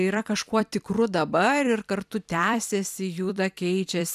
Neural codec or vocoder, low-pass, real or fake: vocoder, 44.1 kHz, 128 mel bands every 256 samples, BigVGAN v2; 14.4 kHz; fake